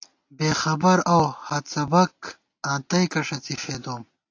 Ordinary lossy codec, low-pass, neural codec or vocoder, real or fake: AAC, 48 kbps; 7.2 kHz; none; real